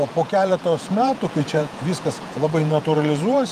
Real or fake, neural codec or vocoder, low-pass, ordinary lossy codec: fake; vocoder, 48 kHz, 128 mel bands, Vocos; 14.4 kHz; Opus, 32 kbps